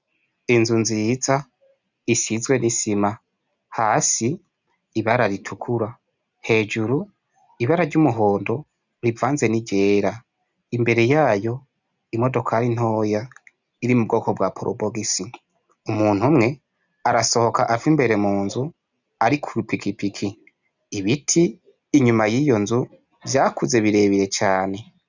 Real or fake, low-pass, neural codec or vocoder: real; 7.2 kHz; none